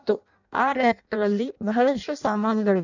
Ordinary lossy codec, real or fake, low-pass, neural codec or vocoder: none; fake; 7.2 kHz; codec, 16 kHz in and 24 kHz out, 0.6 kbps, FireRedTTS-2 codec